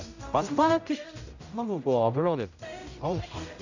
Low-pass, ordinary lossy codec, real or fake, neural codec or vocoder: 7.2 kHz; none; fake; codec, 16 kHz, 0.5 kbps, X-Codec, HuBERT features, trained on general audio